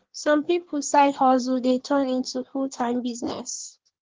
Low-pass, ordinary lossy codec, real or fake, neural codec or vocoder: 7.2 kHz; Opus, 16 kbps; fake; codec, 16 kHz in and 24 kHz out, 1.1 kbps, FireRedTTS-2 codec